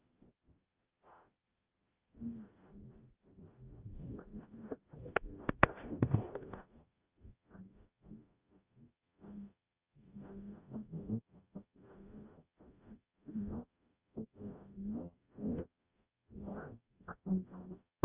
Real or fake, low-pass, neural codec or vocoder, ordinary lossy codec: fake; 3.6 kHz; codec, 44.1 kHz, 0.9 kbps, DAC; Opus, 24 kbps